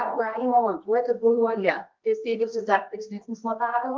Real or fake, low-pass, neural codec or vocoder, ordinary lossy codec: fake; 7.2 kHz; codec, 24 kHz, 0.9 kbps, WavTokenizer, medium music audio release; Opus, 32 kbps